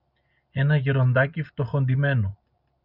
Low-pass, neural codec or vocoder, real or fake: 5.4 kHz; none; real